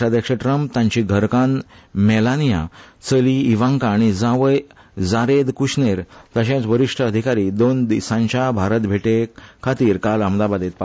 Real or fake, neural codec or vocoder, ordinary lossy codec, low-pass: real; none; none; none